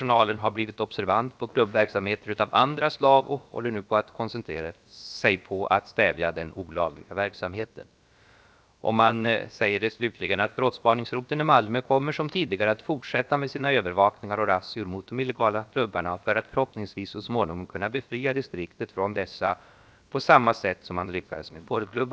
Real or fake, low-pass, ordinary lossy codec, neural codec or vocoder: fake; none; none; codec, 16 kHz, about 1 kbps, DyCAST, with the encoder's durations